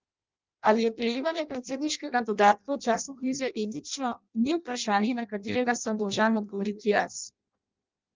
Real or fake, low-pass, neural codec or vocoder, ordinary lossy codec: fake; 7.2 kHz; codec, 16 kHz in and 24 kHz out, 0.6 kbps, FireRedTTS-2 codec; Opus, 24 kbps